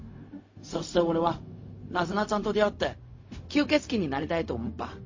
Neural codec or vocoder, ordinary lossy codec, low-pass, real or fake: codec, 16 kHz, 0.4 kbps, LongCat-Audio-Codec; MP3, 32 kbps; 7.2 kHz; fake